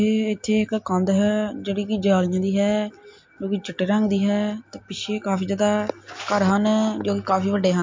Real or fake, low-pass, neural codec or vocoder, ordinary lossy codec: real; 7.2 kHz; none; MP3, 48 kbps